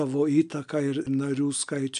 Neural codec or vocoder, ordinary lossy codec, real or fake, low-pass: none; AAC, 96 kbps; real; 9.9 kHz